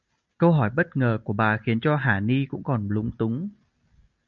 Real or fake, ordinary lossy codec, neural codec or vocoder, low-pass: real; MP3, 64 kbps; none; 7.2 kHz